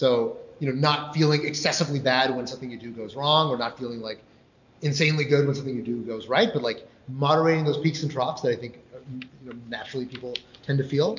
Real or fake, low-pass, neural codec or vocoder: real; 7.2 kHz; none